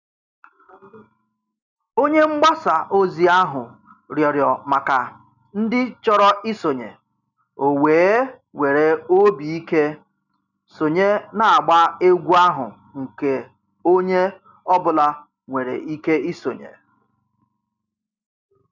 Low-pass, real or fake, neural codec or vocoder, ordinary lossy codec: 7.2 kHz; real; none; none